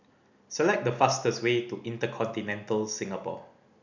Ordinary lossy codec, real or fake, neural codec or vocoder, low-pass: none; real; none; 7.2 kHz